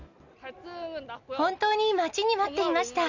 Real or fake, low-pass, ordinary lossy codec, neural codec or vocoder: real; 7.2 kHz; none; none